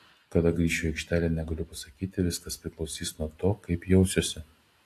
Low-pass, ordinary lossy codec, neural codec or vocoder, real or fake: 14.4 kHz; AAC, 64 kbps; none; real